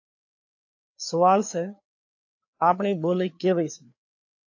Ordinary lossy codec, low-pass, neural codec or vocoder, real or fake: AAC, 48 kbps; 7.2 kHz; codec, 16 kHz, 4 kbps, FreqCodec, larger model; fake